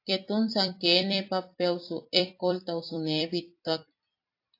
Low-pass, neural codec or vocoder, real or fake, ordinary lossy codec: 5.4 kHz; vocoder, 44.1 kHz, 128 mel bands every 256 samples, BigVGAN v2; fake; AAC, 32 kbps